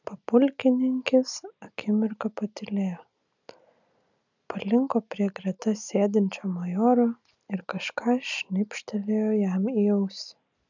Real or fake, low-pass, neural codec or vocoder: real; 7.2 kHz; none